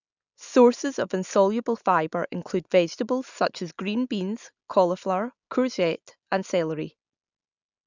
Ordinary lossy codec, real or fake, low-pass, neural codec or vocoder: none; real; 7.2 kHz; none